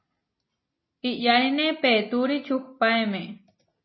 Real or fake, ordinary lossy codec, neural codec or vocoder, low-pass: real; MP3, 24 kbps; none; 7.2 kHz